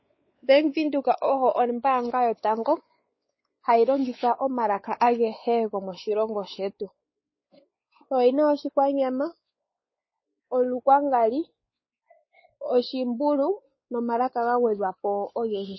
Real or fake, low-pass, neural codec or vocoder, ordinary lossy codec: fake; 7.2 kHz; codec, 16 kHz, 4 kbps, X-Codec, WavLM features, trained on Multilingual LibriSpeech; MP3, 24 kbps